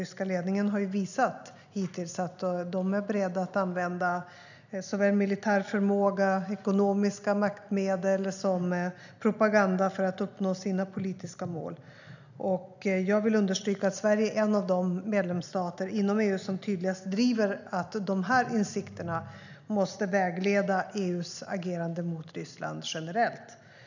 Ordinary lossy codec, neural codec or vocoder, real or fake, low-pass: none; none; real; 7.2 kHz